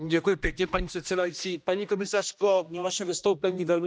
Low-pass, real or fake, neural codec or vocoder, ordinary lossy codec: none; fake; codec, 16 kHz, 1 kbps, X-Codec, HuBERT features, trained on general audio; none